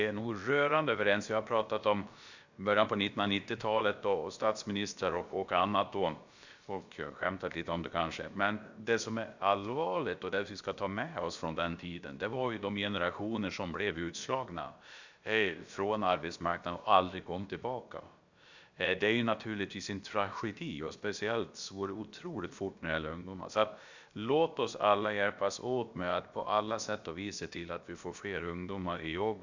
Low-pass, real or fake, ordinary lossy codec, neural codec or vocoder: 7.2 kHz; fake; Opus, 64 kbps; codec, 16 kHz, about 1 kbps, DyCAST, with the encoder's durations